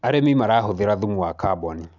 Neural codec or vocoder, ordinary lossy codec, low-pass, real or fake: none; none; 7.2 kHz; real